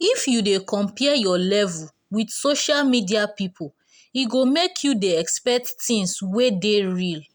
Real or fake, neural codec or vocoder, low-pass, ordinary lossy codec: real; none; none; none